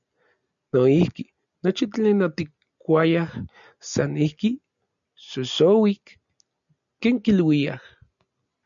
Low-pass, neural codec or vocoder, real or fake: 7.2 kHz; none; real